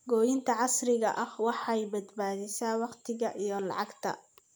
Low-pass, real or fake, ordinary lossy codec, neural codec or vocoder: none; real; none; none